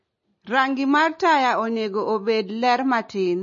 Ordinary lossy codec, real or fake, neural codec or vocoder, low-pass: MP3, 32 kbps; real; none; 7.2 kHz